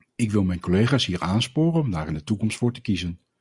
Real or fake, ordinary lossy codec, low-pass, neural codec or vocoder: real; Opus, 64 kbps; 10.8 kHz; none